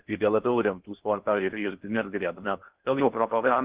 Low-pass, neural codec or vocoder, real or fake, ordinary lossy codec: 3.6 kHz; codec, 16 kHz in and 24 kHz out, 0.6 kbps, FocalCodec, streaming, 4096 codes; fake; Opus, 16 kbps